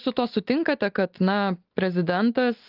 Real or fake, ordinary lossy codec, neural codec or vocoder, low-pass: real; Opus, 32 kbps; none; 5.4 kHz